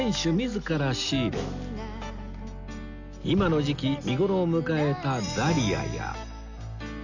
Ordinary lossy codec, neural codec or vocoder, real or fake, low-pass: none; none; real; 7.2 kHz